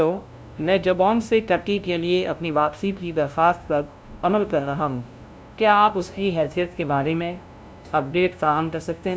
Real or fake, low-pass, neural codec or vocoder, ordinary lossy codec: fake; none; codec, 16 kHz, 0.5 kbps, FunCodec, trained on LibriTTS, 25 frames a second; none